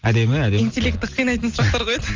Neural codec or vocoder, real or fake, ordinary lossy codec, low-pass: none; real; Opus, 32 kbps; 7.2 kHz